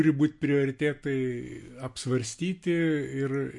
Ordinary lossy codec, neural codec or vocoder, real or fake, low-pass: MP3, 48 kbps; none; real; 10.8 kHz